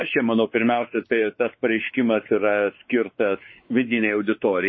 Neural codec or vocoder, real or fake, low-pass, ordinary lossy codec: codec, 16 kHz, 4 kbps, X-Codec, WavLM features, trained on Multilingual LibriSpeech; fake; 7.2 kHz; MP3, 24 kbps